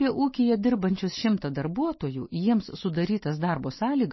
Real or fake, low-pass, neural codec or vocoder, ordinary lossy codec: real; 7.2 kHz; none; MP3, 24 kbps